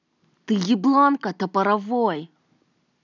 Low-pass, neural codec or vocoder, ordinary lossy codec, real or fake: 7.2 kHz; none; none; real